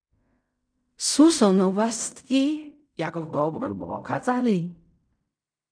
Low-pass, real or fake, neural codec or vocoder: 9.9 kHz; fake; codec, 16 kHz in and 24 kHz out, 0.4 kbps, LongCat-Audio-Codec, fine tuned four codebook decoder